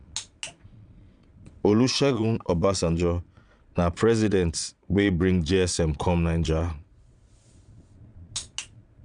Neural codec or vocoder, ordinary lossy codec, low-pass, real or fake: vocoder, 22.05 kHz, 80 mel bands, Vocos; none; 9.9 kHz; fake